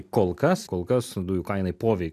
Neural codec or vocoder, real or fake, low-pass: none; real; 14.4 kHz